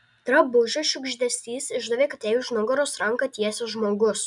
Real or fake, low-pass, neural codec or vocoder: real; 10.8 kHz; none